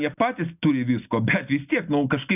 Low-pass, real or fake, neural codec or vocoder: 3.6 kHz; real; none